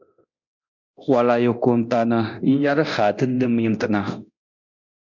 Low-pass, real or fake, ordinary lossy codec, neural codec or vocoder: 7.2 kHz; fake; MP3, 64 kbps; codec, 24 kHz, 0.9 kbps, DualCodec